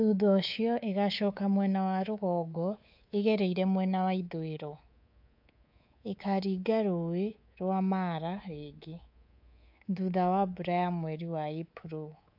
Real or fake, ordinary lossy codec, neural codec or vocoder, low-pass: fake; AAC, 48 kbps; codec, 24 kHz, 3.1 kbps, DualCodec; 5.4 kHz